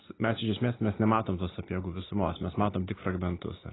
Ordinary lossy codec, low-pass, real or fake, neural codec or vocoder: AAC, 16 kbps; 7.2 kHz; real; none